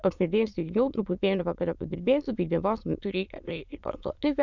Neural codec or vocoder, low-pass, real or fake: autoencoder, 22.05 kHz, a latent of 192 numbers a frame, VITS, trained on many speakers; 7.2 kHz; fake